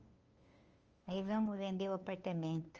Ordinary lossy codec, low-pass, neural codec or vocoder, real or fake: Opus, 24 kbps; 7.2 kHz; codec, 16 kHz, 2 kbps, FunCodec, trained on LibriTTS, 25 frames a second; fake